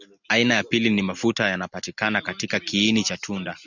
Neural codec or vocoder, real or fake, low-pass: none; real; 7.2 kHz